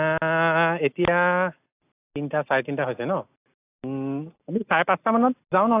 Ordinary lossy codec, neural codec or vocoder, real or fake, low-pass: AAC, 24 kbps; none; real; 3.6 kHz